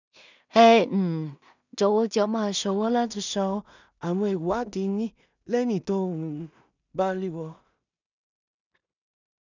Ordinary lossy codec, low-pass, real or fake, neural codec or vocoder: none; 7.2 kHz; fake; codec, 16 kHz in and 24 kHz out, 0.4 kbps, LongCat-Audio-Codec, two codebook decoder